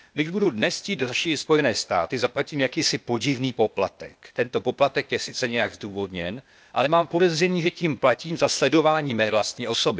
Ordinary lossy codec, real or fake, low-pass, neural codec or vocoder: none; fake; none; codec, 16 kHz, 0.8 kbps, ZipCodec